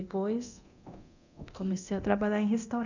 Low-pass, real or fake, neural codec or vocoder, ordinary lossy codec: 7.2 kHz; fake; codec, 24 kHz, 0.9 kbps, DualCodec; none